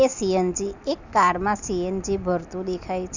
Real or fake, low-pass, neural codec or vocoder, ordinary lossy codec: real; 7.2 kHz; none; none